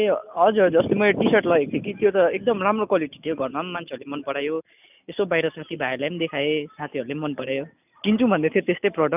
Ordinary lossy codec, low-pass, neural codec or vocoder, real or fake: none; 3.6 kHz; none; real